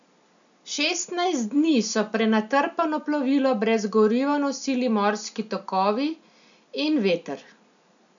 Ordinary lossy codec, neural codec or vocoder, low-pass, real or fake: none; none; 7.2 kHz; real